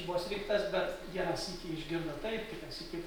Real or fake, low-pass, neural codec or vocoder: real; 19.8 kHz; none